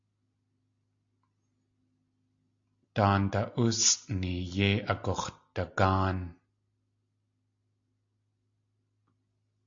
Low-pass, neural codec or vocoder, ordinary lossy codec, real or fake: 7.2 kHz; none; AAC, 48 kbps; real